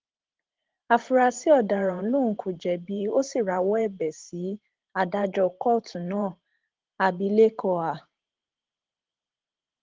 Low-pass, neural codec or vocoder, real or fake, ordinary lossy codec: 7.2 kHz; vocoder, 44.1 kHz, 128 mel bands every 512 samples, BigVGAN v2; fake; Opus, 16 kbps